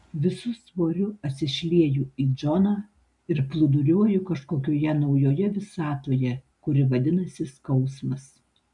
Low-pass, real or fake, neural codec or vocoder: 10.8 kHz; real; none